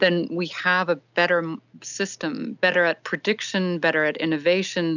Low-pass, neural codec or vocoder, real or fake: 7.2 kHz; none; real